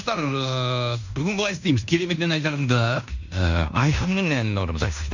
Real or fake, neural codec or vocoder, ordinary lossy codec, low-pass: fake; codec, 16 kHz in and 24 kHz out, 0.9 kbps, LongCat-Audio-Codec, fine tuned four codebook decoder; none; 7.2 kHz